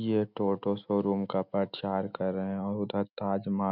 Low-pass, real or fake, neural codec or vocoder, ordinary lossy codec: 5.4 kHz; real; none; none